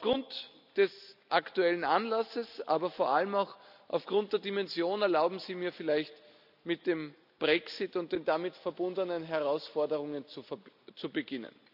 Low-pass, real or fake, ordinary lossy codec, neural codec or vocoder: 5.4 kHz; real; none; none